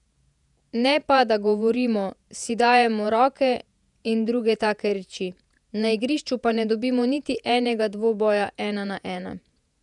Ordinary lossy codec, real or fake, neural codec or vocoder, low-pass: none; fake; vocoder, 48 kHz, 128 mel bands, Vocos; 10.8 kHz